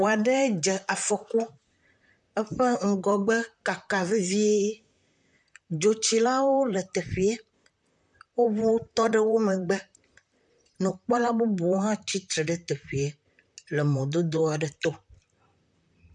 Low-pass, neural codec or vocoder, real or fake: 10.8 kHz; vocoder, 44.1 kHz, 128 mel bands, Pupu-Vocoder; fake